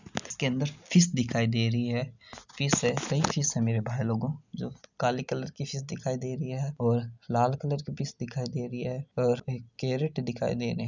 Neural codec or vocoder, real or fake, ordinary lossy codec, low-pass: none; real; none; 7.2 kHz